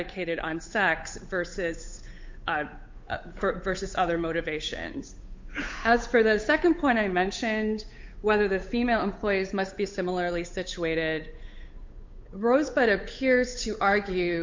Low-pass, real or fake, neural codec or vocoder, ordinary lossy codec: 7.2 kHz; fake; codec, 16 kHz, 8 kbps, FunCodec, trained on Chinese and English, 25 frames a second; MP3, 48 kbps